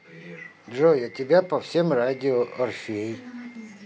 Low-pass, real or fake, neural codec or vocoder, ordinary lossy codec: none; real; none; none